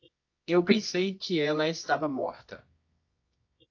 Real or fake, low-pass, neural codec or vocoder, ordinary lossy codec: fake; 7.2 kHz; codec, 24 kHz, 0.9 kbps, WavTokenizer, medium music audio release; AAC, 48 kbps